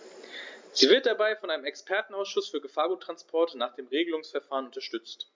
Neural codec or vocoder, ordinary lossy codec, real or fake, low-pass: none; MP3, 64 kbps; real; 7.2 kHz